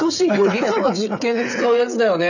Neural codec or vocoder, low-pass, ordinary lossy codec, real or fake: codec, 16 kHz, 8 kbps, FreqCodec, smaller model; 7.2 kHz; none; fake